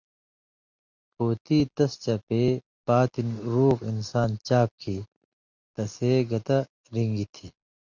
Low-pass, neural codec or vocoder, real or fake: 7.2 kHz; none; real